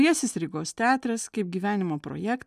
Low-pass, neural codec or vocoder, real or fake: 14.4 kHz; none; real